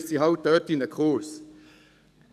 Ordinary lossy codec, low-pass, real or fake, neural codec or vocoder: none; 14.4 kHz; fake; codec, 44.1 kHz, 7.8 kbps, DAC